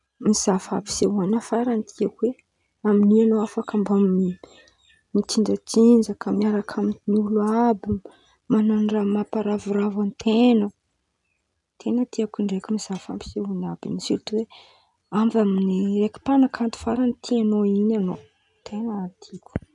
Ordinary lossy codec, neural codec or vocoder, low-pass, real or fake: none; none; 10.8 kHz; real